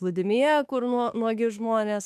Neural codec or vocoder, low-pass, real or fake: autoencoder, 48 kHz, 32 numbers a frame, DAC-VAE, trained on Japanese speech; 14.4 kHz; fake